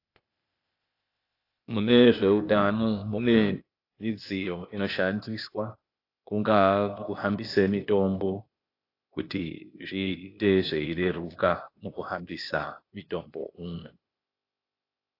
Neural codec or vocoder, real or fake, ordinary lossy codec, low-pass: codec, 16 kHz, 0.8 kbps, ZipCodec; fake; AAC, 32 kbps; 5.4 kHz